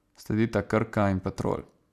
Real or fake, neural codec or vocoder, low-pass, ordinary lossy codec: real; none; 14.4 kHz; AAC, 96 kbps